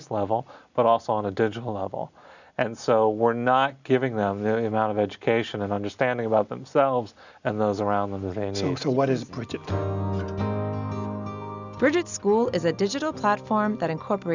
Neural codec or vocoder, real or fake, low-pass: none; real; 7.2 kHz